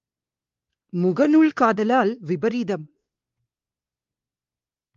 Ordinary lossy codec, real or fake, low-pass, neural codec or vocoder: Opus, 32 kbps; fake; 7.2 kHz; codec, 16 kHz, 2 kbps, X-Codec, WavLM features, trained on Multilingual LibriSpeech